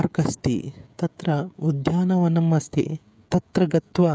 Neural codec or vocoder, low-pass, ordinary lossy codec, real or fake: codec, 16 kHz, 16 kbps, FreqCodec, smaller model; none; none; fake